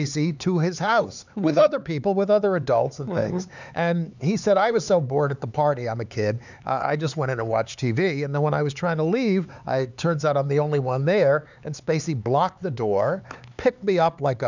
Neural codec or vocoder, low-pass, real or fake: codec, 16 kHz, 4 kbps, X-Codec, HuBERT features, trained on LibriSpeech; 7.2 kHz; fake